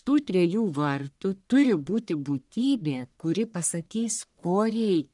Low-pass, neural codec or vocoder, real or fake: 10.8 kHz; codec, 44.1 kHz, 1.7 kbps, Pupu-Codec; fake